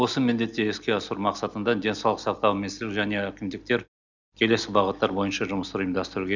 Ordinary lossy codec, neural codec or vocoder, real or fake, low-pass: none; none; real; 7.2 kHz